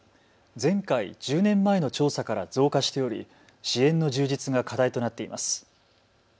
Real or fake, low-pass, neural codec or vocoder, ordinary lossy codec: real; none; none; none